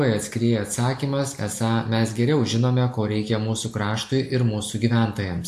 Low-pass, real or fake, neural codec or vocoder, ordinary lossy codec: 14.4 kHz; real; none; AAC, 64 kbps